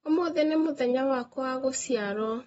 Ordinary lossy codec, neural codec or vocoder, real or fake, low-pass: AAC, 24 kbps; none; real; 19.8 kHz